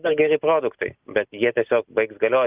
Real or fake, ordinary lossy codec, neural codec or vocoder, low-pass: real; Opus, 24 kbps; none; 3.6 kHz